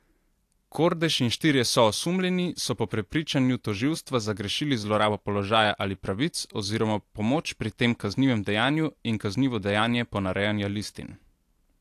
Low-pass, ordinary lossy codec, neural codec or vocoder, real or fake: 14.4 kHz; AAC, 64 kbps; none; real